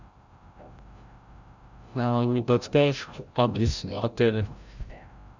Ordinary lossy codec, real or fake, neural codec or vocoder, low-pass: Opus, 64 kbps; fake; codec, 16 kHz, 0.5 kbps, FreqCodec, larger model; 7.2 kHz